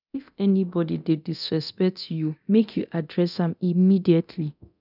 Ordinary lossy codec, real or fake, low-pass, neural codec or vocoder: none; fake; 5.4 kHz; codec, 24 kHz, 0.9 kbps, DualCodec